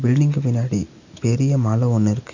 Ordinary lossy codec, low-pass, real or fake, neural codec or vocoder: none; 7.2 kHz; real; none